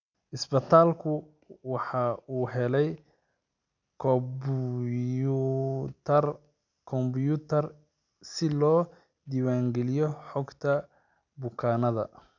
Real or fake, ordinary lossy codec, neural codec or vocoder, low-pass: real; none; none; 7.2 kHz